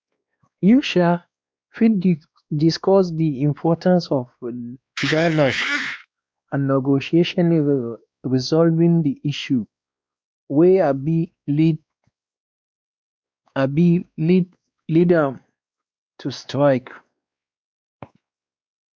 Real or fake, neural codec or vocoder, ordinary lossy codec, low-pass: fake; codec, 16 kHz, 2 kbps, X-Codec, WavLM features, trained on Multilingual LibriSpeech; none; none